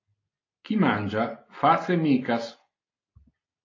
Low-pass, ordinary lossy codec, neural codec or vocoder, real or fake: 7.2 kHz; AAC, 32 kbps; none; real